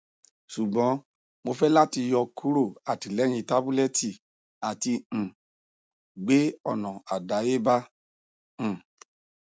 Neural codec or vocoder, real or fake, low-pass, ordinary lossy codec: none; real; none; none